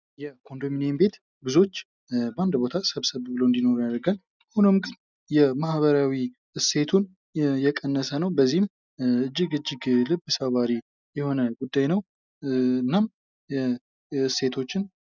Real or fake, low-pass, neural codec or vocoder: real; 7.2 kHz; none